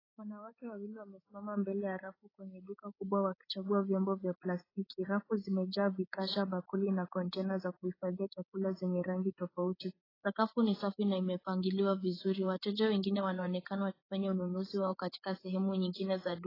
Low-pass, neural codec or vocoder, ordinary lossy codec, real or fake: 5.4 kHz; codec, 16 kHz, 8 kbps, FreqCodec, larger model; AAC, 24 kbps; fake